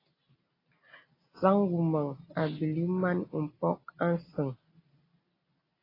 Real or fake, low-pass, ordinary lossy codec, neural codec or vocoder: real; 5.4 kHz; AAC, 24 kbps; none